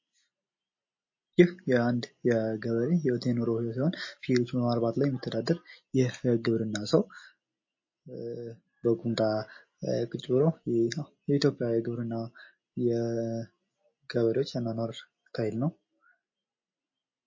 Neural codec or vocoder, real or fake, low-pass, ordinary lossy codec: none; real; 7.2 kHz; MP3, 32 kbps